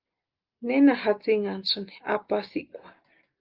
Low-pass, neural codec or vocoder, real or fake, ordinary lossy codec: 5.4 kHz; none; real; Opus, 32 kbps